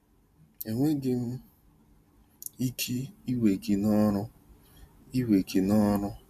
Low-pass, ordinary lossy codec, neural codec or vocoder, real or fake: 14.4 kHz; none; none; real